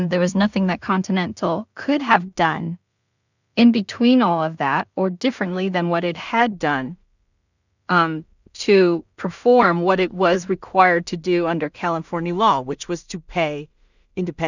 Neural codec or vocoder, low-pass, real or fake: codec, 16 kHz in and 24 kHz out, 0.4 kbps, LongCat-Audio-Codec, two codebook decoder; 7.2 kHz; fake